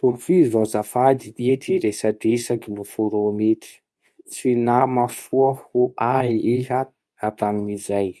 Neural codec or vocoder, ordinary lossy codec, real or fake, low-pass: codec, 24 kHz, 0.9 kbps, WavTokenizer, medium speech release version 2; none; fake; none